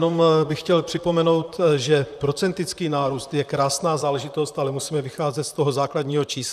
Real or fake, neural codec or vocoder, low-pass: fake; vocoder, 44.1 kHz, 128 mel bands, Pupu-Vocoder; 14.4 kHz